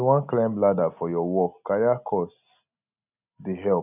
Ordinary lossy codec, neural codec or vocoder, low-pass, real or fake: none; none; 3.6 kHz; real